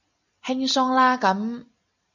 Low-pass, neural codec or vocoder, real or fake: 7.2 kHz; none; real